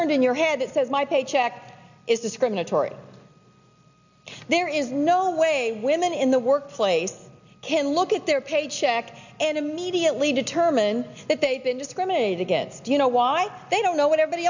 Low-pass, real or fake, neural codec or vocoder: 7.2 kHz; real; none